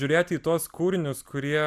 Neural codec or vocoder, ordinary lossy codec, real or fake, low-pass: none; Opus, 64 kbps; real; 14.4 kHz